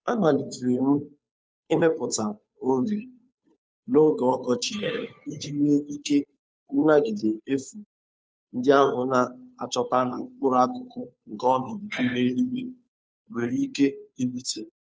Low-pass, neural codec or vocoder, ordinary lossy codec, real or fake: none; codec, 16 kHz, 2 kbps, FunCodec, trained on Chinese and English, 25 frames a second; none; fake